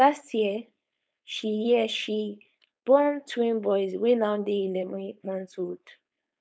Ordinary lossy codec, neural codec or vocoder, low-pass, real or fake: none; codec, 16 kHz, 4.8 kbps, FACodec; none; fake